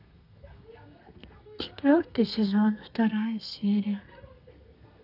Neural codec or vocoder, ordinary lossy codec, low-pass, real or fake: codec, 32 kHz, 1.9 kbps, SNAC; none; 5.4 kHz; fake